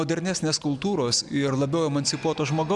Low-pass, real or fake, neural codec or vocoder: 10.8 kHz; real; none